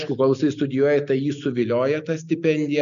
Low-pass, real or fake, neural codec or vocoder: 7.2 kHz; real; none